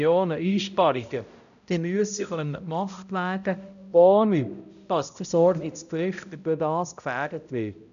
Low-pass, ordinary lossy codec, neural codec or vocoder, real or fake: 7.2 kHz; none; codec, 16 kHz, 0.5 kbps, X-Codec, HuBERT features, trained on balanced general audio; fake